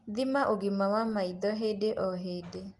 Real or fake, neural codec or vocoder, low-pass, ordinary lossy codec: real; none; 10.8 kHz; Opus, 32 kbps